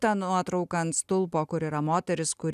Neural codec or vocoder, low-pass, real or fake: none; 14.4 kHz; real